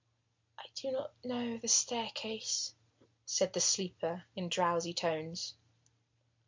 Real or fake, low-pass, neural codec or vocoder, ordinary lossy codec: real; 7.2 kHz; none; MP3, 48 kbps